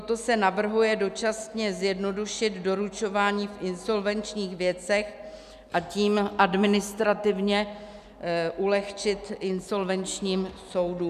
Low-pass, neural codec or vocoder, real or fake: 14.4 kHz; none; real